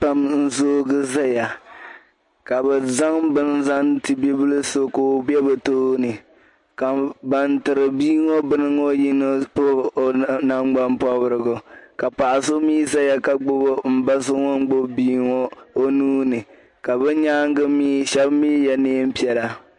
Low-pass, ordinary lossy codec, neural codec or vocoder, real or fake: 10.8 kHz; MP3, 48 kbps; none; real